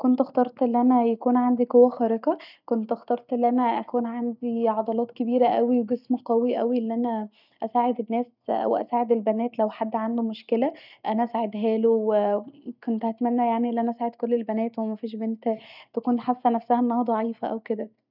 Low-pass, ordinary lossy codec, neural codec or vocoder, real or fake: 5.4 kHz; none; none; real